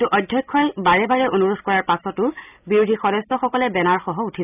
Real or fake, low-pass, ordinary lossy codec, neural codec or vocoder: real; 3.6 kHz; none; none